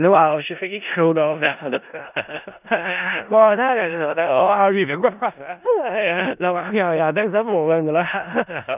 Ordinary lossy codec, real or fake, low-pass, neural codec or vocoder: none; fake; 3.6 kHz; codec, 16 kHz in and 24 kHz out, 0.4 kbps, LongCat-Audio-Codec, four codebook decoder